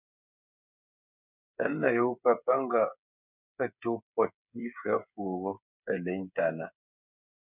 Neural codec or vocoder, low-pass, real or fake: codec, 16 kHz, 8 kbps, FreqCodec, smaller model; 3.6 kHz; fake